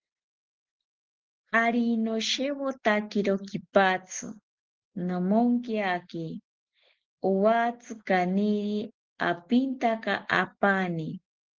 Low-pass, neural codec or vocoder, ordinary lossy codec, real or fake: 7.2 kHz; none; Opus, 16 kbps; real